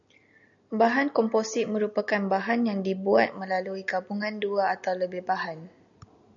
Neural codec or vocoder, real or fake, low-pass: none; real; 7.2 kHz